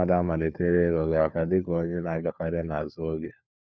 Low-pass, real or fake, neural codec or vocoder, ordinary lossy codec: none; fake; codec, 16 kHz, 4 kbps, FunCodec, trained on LibriTTS, 50 frames a second; none